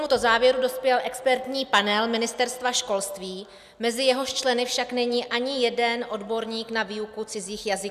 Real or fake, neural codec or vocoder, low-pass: real; none; 14.4 kHz